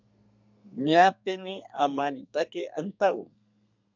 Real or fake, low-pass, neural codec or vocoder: fake; 7.2 kHz; codec, 32 kHz, 1.9 kbps, SNAC